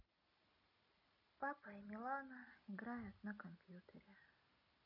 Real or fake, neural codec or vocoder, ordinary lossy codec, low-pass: real; none; none; 5.4 kHz